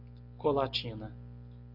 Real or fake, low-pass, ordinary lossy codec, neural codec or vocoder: real; 5.4 kHz; AAC, 48 kbps; none